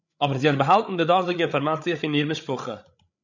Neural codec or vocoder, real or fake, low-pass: codec, 16 kHz, 8 kbps, FreqCodec, larger model; fake; 7.2 kHz